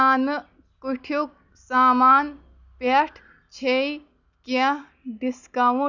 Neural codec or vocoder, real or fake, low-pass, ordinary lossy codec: none; real; 7.2 kHz; none